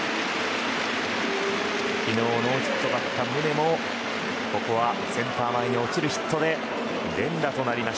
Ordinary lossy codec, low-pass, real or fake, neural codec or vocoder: none; none; real; none